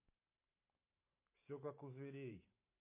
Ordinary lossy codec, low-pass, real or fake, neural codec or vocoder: MP3, 24 kbps; 3.6 kHz; fake; vocoder, 44.1 kHz, 128 mel bands every 512 samples, BigVGAN v2